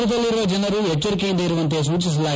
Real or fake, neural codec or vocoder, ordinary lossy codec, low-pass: real; none; none; none